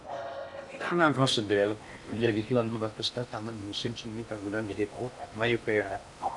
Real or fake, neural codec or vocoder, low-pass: fake; codec, 16 kHz in and 24 kHz out, 0.8 kbps, FocalCodec, streaming, 65536 codes; 10.8 kHz